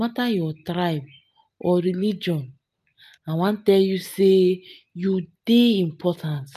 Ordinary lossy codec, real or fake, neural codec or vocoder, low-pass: none; real; none; 14.4 kHz